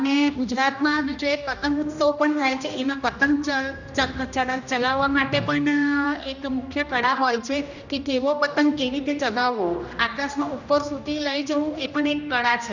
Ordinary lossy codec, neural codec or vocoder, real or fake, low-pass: none; codec, 16 kHz, 1 kbps, X-Codec, HuBERT features, trained on general audio; fake; 7.2 kHz